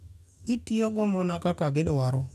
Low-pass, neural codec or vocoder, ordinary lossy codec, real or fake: 14.4 kHz; codec, 44.1 kHz, 2.6 kbps, DAC; none; fake